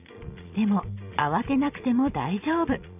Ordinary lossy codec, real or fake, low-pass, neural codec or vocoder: none; fake; 3.6 kHz; vocoder, 22.05 kHz, 80 mel bands, Vocos